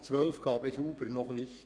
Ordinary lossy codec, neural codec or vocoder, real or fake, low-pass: none; codec, 32 kHz, 1.9 kbps, SNAC; fake; 9.9 kHz